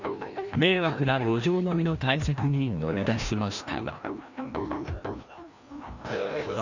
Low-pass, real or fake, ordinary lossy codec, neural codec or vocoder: 7.2 kHz; fake; none; codec, 16 kHz, 1 kbps, FreqCodec, larger model